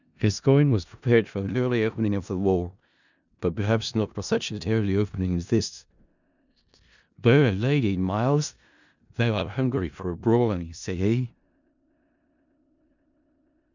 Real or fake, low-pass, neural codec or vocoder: fake; 7.2 kHz; codec, 16 kHz in and 24 kHz out, 0.4 kbps, LongCat-Audio-Codec, four codebook decoder